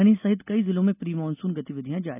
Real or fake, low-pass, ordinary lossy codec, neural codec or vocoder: real; 3.6 kHz; none; none